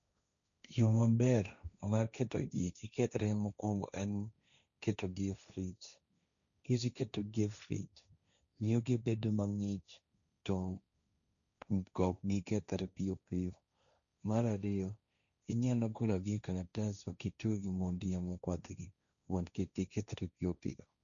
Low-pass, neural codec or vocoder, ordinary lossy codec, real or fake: 7.2 kHz; codec, 16 kHz, 1.1 kbps, Voila-Tokenizer; none; fake